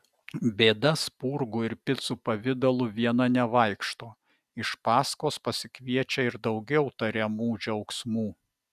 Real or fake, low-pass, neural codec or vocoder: real; 14.4 kHz; none